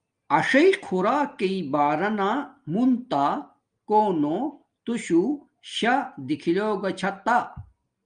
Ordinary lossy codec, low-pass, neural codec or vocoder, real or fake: Opus, 32 kbps; 9.9 kHz; none; real